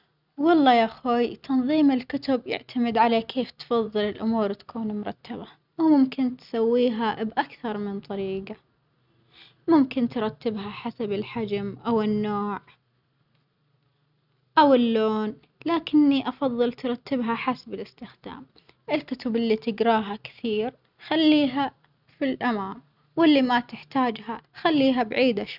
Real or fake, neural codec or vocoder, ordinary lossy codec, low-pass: real; none; none; 5.4 kHz